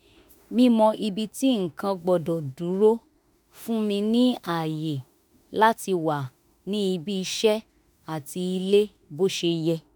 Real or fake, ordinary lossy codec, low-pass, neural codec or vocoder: fake; none; none; autoencoder, 48 kHz, 32 numbers a frame, DAC-VAE, trained on Japanese speech